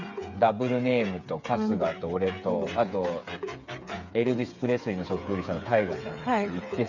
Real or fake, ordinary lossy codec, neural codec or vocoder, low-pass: fake; none; codec, 16 kHz, 8 kbps, FreqCodec, smaller model; 7.2 kHz